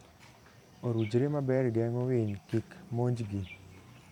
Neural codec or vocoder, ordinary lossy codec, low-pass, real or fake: vocoder, 44.1 kHz, 128 mel bands every 512 samples, BigVGAN v2; none; 19.8 kHz; fake